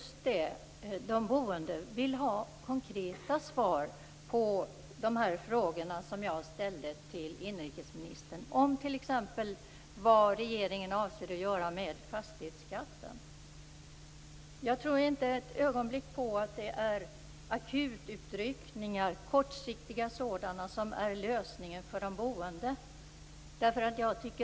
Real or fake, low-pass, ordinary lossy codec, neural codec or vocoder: real; none; none; none